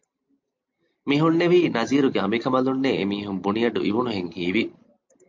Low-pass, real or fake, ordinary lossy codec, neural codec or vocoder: 7.2 kHz; real; MP3, 48 kbps; none